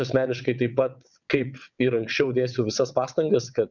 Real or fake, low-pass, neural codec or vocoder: fake; 7.2 kHz; vocoder, 22.05 kHz, 80 mel bands, WaveNeXt